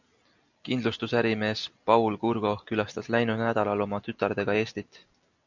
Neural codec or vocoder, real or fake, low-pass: none; real; 7.2 kHz